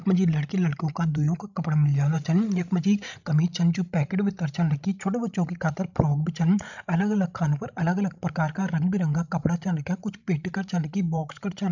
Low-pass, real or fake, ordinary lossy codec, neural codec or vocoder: 7.2 kHz; fake; none; codec, 16 kHz, 16 kbps, FreqCodec, larger model